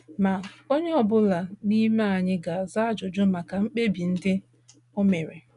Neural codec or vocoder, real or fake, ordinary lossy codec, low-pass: none; real; none; 10.8 kHz